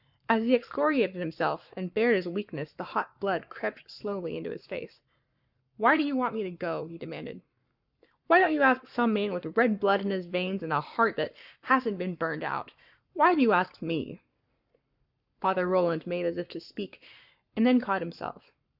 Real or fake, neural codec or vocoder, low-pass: fake; codec, 44.1 kHz, 7.8 kbps, Pupu-Codec; 5.4 kHz